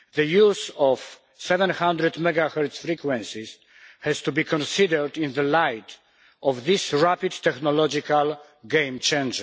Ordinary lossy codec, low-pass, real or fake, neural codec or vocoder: none; none; real; none